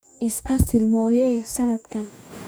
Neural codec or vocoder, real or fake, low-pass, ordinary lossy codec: codec, 44.1 kHz, 2.6 kbps, DAC; fake; none; none